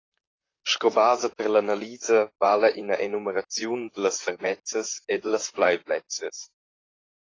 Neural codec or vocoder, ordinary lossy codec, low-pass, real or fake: vocoder, 44.1 kHz, 128 mel bands, Pupu-Vocoder; AAC, 32 kbps; 7.2 kHz; fake